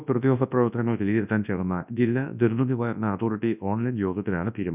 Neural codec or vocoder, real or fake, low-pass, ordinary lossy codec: codec, 24 kHz, 0.9 kbps, WavTokenizer, large speech release; fake; 3.6 kHz; none